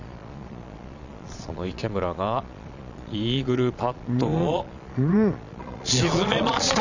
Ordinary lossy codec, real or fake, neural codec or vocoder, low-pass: none; fake; vocoder, 22.05 kHz, 80 mel bands, Vocos; 7.2 kHz